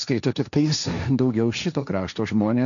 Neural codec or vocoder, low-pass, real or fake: codec, 16 kHz, 1.1 kbps, Voila-Tokenizer; 7.2 kHz; fake